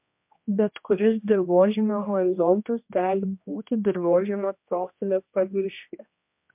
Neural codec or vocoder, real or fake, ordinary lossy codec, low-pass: codec, 16 kHz, 1 kbps, X-Codec, HuBERT features, trained on general audio; fake; MP3, 32 kbps; 3.6 kHz